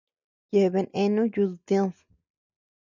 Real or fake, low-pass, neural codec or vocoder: real; 7.2 kHz; none